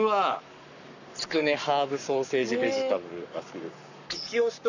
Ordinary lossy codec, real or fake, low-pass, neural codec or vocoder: none; fake; 7.2 kHz; codec, 44.1 kHz, 7.8 kbps, Pupu-Codec